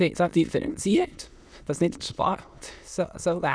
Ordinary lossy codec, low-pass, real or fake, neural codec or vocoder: none; none; fake; autoencoder, 22.05 kHz, a latent of 192 numbers a frame, VITS, trained on many speakers